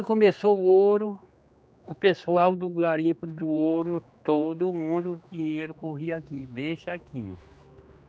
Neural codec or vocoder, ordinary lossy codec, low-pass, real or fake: codec, 16 kHz, 2 kbps, X-Codec, HuBERT features, trained on general audio; none; none; fake